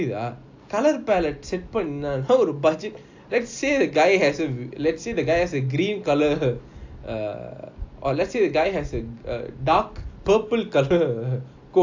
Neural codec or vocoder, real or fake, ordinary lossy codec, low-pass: none; real; AAC, 48 kbps; 7.2 kHz